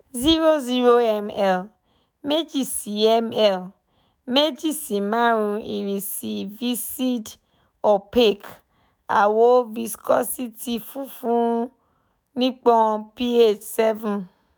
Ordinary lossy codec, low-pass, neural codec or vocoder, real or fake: none; none; autoencoder, 48 kHz, 128 numbers a frame, DAC-VAE, trained on Japanese speech; fake